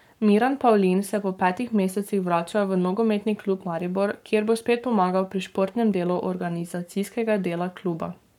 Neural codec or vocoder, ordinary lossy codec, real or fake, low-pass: codec, 44.1 kHz, 7.8 kbps, Pupu-Codec; none; fake; 19.8 kHz